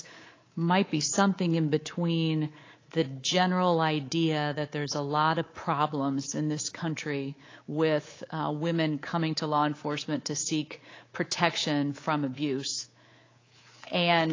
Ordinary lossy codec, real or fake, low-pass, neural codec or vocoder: AAC, 32 kbps; fake; 7.2 kHz; codec, 24 kHz, 3.1 kbps, DualCodec